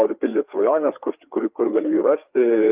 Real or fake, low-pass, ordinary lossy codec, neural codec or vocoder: fake; 3.6 kHz; Opus, 24 kbps; codec, 16 kHz, 4 kbps, FreqCodec, larger model